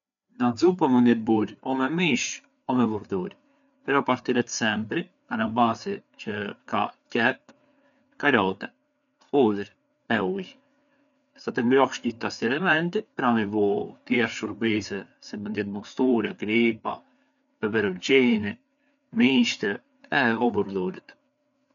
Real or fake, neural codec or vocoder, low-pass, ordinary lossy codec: fake; codec, 16 kHz, 4 kbps, FreqCodec, larger model; 7.2 kHz; none